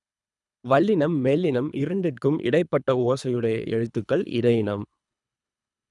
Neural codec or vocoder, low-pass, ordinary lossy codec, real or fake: codec, 24 kHz, 3 kbps, HILCodec; 10.8 kHz; none; fake